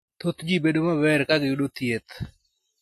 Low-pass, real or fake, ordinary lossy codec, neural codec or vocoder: 14.4 kHz; real; AAC, 48 kbps; none